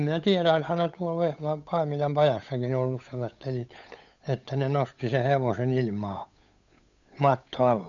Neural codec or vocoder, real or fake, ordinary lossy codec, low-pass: codec, 16 kHz, 8 kbps, FunCodec, trained on Chinese and English, 25 frames a second; fake; none; 7.2 kHz